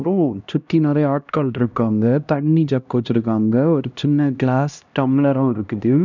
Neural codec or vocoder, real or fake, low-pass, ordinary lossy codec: codec, 16 kHz, 1 kbps, X-Codec, HuBERT features, trained on LibriSpeech; fake; 7.2 kHz; none